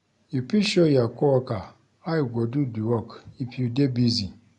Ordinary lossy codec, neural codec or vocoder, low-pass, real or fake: none; none; 10.8 kHz; real